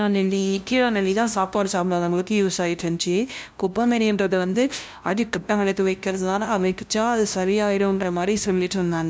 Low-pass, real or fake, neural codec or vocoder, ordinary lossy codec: none; fake; codec, 16 kHz, 0.5 kbps, FunCodec, trained on LibriTTS, 25 frames a second; none